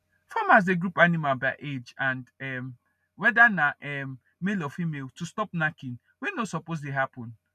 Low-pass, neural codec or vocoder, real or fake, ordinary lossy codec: 14.4 kHz; none; real; none